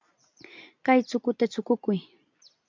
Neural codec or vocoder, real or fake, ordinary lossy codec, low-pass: none; real; MP3, 64 kbps; 7.2 kHz